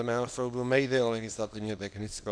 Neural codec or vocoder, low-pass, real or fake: codec, 24 kHz, 0.9 kbps, WavTokenizer, small release; 9.9 kHz; fake